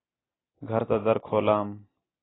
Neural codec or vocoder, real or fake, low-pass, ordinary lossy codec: none; real; 7.2 kHz; AAC, 16 kbps